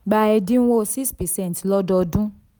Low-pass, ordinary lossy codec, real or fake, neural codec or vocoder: none; none; real; none